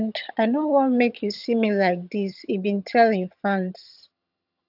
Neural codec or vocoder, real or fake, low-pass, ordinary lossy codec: vocoder, 22.05 kHz, 80 mel bands, HiFi-GAN; fake; 5.4 kHz; AAC, 48 kbps